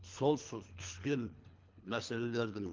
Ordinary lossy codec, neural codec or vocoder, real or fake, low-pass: Opus, 24 kbps; codec, 24 kHz, 3 kbps, HILCodec; fake; 7.2 kHz